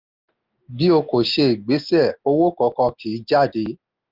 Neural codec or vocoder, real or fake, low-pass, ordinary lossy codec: none; real; 5.4 kHz; Opus, 32 kbps